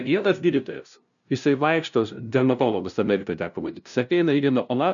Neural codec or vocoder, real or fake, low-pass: codec, 16 kHz, 0.5 kbps, FunCodec, trained on LibriTTS, 25 frames a second; fake; 7.2 kHz